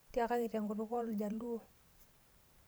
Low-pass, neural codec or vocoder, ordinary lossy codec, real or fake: none; vocoder, 44.1 kHz, 128 mel bands every 512 samples, BigVGAN v2; none; fake